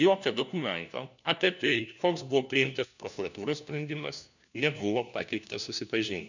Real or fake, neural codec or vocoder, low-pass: fake; codec, 16 kHz, 1 kbps, FunCodec, trained on LibriTTS, 50 frames a second; 7.2 kHz